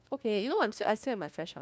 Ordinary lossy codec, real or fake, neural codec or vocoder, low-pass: none; fake; codec, 16 kHz, 1 kbps, FunCodec, trained on LibriTTS, 50 frames a second; none